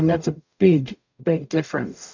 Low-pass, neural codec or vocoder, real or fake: 7.2 kHz; codec, 44.1 kHz, 0.9 kbps, DAC; fake